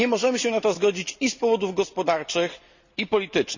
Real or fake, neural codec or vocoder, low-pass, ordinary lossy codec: real; none; 7.2 kHz; Opus, 64 kbps